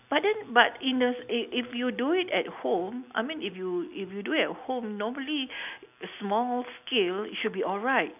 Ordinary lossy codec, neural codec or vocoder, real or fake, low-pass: none; none; real; 3.6 kHz